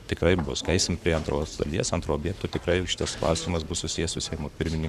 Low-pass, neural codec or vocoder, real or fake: 14.4 kHz; codec, 44.1 kHz, 7.8 kbps, Pupu-Codec; fake